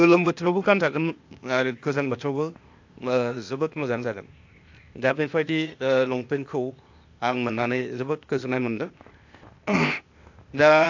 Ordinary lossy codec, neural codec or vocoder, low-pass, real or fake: AAC, 48 kbps; codec, 16 kHz, 0.8 kbps, ZipCodec; 7.2 kHz; fake